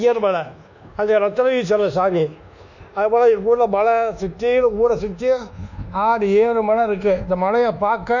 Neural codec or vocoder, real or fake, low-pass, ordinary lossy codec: codec, 24 kHz, 1.2 kbps, DualCodec; fake; 7.2 kHz; none